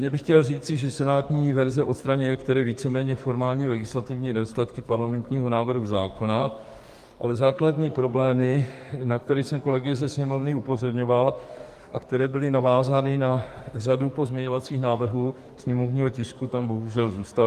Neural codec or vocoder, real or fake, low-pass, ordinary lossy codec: codec, 44.1 kHz, 2.6 kbps, SNAC; fake; 14.4 kHz; Opus, 32 kbps